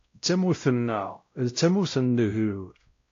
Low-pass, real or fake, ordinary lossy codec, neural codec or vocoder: 7.2 kHz; fake; AAC, 48 kbps; codec, 16 kHz, 0.5 kbps, X-Codec, WavLM features, trained on Multilingual LibriSpeech